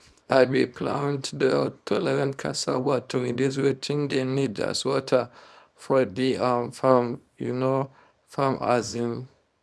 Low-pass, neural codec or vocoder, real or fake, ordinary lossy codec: none; codec, 24 kHz, 0.9 kbps, WavTokenizer, small release; fake; none